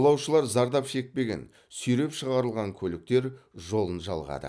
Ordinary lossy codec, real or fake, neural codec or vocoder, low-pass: none; real; none; none